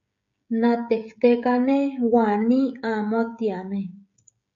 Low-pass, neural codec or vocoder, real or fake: 7.2 kHz; codec, 16 kHz, 16 kbps, FreqCodec, smaller model; fake